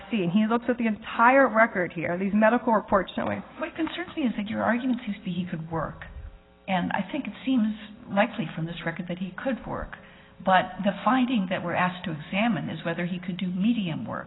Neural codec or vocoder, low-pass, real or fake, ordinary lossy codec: none; 7.2 kHz; real; AAC, 16 kbps